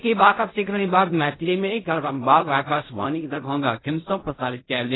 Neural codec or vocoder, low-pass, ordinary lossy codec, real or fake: codec, 16 kHz in and 24 kHz out, 0.4 kbps, LongCat-Audio-Codec, fine tuned four codebook decoder; 7.2 kHz; AAC, 16 kbps; fake